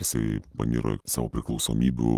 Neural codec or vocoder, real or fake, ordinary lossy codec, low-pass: none; real; Opus, 24 kbps; 14.4 kHz